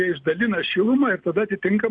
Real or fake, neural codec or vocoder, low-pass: real; none; 9.9 kHz